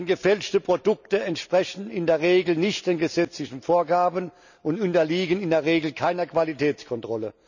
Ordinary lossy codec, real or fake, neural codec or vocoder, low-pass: none; real; none; 7.2 kHz